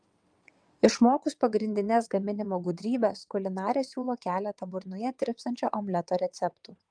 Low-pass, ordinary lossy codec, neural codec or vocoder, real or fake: 9.9 kHz; Opus, 32 kbps; vocoder, 22.05 kHz, 80 mel bands, WaveNeXt; fake